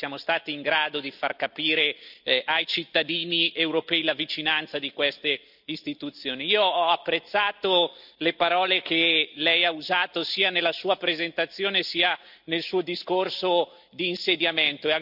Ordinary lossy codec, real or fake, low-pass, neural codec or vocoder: none; real; 5.4 kHz; none